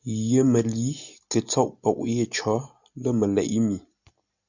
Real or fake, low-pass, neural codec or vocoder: real; 7.2 kHz; none